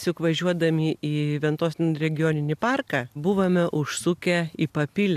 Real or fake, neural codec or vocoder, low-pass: real; none; 14.4 kHz